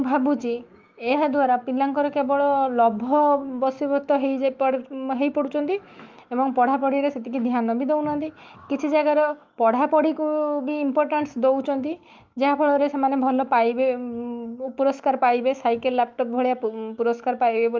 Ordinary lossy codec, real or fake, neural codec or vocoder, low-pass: Opus, 24 kbps; fake; autoencoder, 48 kHz, 128 numbers a frame, DAC-VAE, trained on Japanese speech; 7.2 kHz